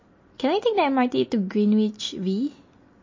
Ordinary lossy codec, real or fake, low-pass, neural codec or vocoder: MP3, 32 kbps; real; 7.2 kHz; none